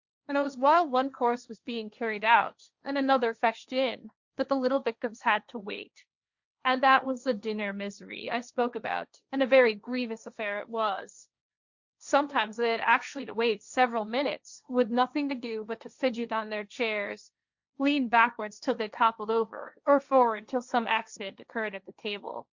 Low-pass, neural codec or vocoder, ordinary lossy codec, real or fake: 7.2 kHz; codec, 16 kHz, 1.1 kbps, Voila-Tokenizer; Opus, 64 kbps; fake